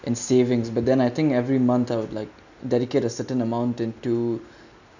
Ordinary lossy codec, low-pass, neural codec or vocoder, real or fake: none; 7.2 kHz; none; real